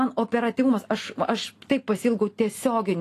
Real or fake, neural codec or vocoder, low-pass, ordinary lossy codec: real; none; 14.4 kHz; AAC, 48 kbps